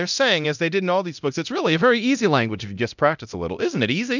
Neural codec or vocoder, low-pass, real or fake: codec, 24 kHz, 0.9 kbps, DualCodec; 7.2 kHz; fake